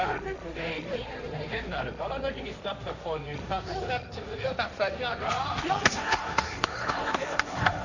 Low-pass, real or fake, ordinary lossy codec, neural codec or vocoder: 7.2 kHz; fake; none; codec, 16 kHz, 1.1 kbps, Voila-Tokenizer